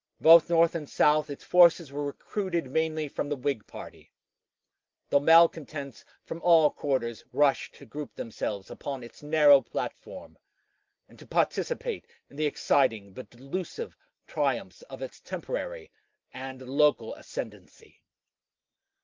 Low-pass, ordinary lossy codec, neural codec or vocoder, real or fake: 7.2 kHz; Opus, 24 kbps; none; real